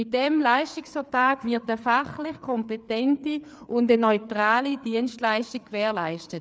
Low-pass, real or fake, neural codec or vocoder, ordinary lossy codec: none; fake; codec, 16 kHz, 4 kbps, FreqCodec, larger model; none